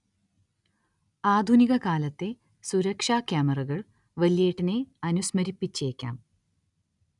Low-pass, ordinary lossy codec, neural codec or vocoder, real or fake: 10.8 kHz; none; none; real